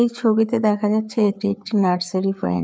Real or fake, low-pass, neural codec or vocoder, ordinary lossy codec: fake; none; codec, 16 kHz, 4 kbps, FreqCodec, larger model; none